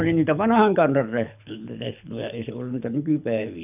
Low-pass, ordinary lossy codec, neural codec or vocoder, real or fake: 3.6 kHz; none; codec, 24 kHz, 6 kbps, HILCodec; fake